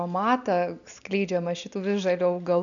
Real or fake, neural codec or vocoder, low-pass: real; none; 7.2 kHz